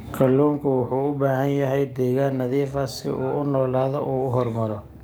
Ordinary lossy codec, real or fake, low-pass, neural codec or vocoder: none; fake; none; codec, 44.1 kHz, 7.8 kbps, DAC